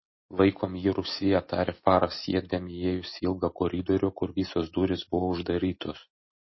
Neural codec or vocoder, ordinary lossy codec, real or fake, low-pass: none; MP3, 24 kbps; real; 7.2 kHz